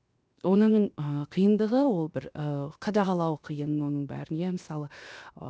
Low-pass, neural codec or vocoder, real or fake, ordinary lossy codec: none; codec, 16 kHz, 0.7 kbps, FocalCodec; fake; none